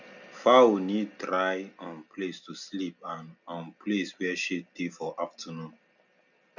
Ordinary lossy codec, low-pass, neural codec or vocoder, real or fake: none; 7.2 kHz; none; real